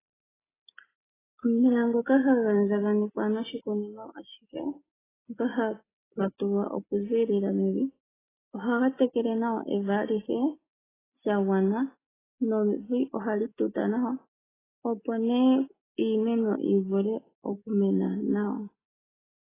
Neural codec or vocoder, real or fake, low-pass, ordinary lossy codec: none; real; 3.6 kHz; AAC, 16 kbps